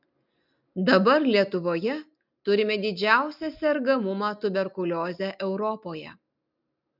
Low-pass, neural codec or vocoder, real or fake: 5.4 kHz; none; real